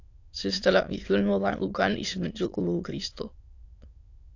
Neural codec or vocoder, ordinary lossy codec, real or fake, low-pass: autoencoder, 22.05 kHz, a latent of 192 numbers a frame, VITS, trained on many speakers; AAC, 48 kbps; fake; 7.2 kHz